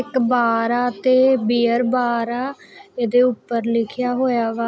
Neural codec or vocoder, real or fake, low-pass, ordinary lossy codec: none; real; none; none